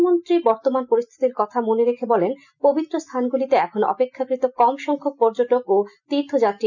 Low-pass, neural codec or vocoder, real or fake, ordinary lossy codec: 7.2 kHz; none; real; none